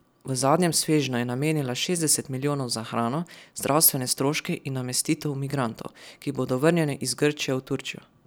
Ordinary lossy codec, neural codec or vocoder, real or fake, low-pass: none; none; real; none